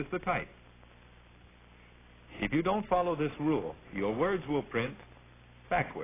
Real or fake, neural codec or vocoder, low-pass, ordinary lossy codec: real; none; 3.6 kHz; AAC, 16 kbps